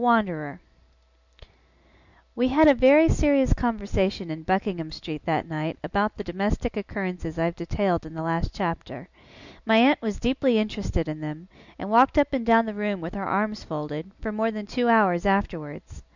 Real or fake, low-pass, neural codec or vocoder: real; 7.2 kHz; none